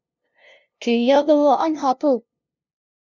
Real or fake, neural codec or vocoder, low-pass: fake; codec, 16 kHz, 0.5 kbps, FunCodec, trained on LibriTTS, 25 frames a second; 7.2 kHz